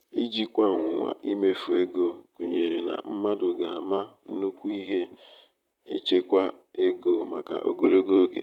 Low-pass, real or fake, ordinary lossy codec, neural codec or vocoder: 19.8 kHz; fake; none; vocoder, 44.1 kHz, 128 mel bands, Pupu-Vocoder